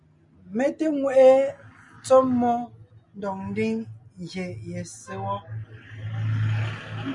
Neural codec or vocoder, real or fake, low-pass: none; real; 10.8 kHz